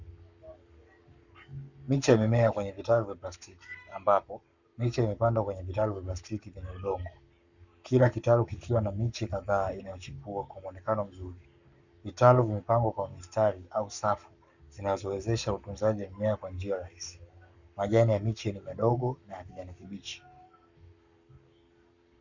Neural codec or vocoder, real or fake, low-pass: codec, 44.1 kHz, 7.8 kbps, Pupu-Codec; fake; 7.2 kHz